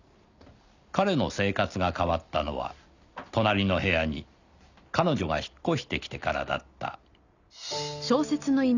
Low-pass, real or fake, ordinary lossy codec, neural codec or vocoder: 7.2 kHz; real; none; none